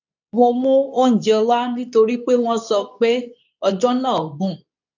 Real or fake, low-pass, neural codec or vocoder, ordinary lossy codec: fake; 7.2 kHz; codec, 24 kHz, 0.9 kbps, WavTokenizer, medium speech release version 2; none